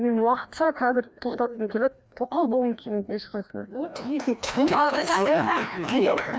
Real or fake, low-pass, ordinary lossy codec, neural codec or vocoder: fake; none; none; codec, 16 kHz, 1 kbps, FreqCodec, larger model